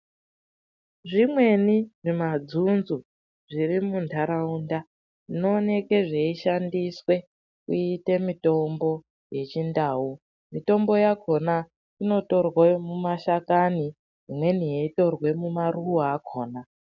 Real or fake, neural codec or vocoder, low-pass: real; none; 7.2 kHz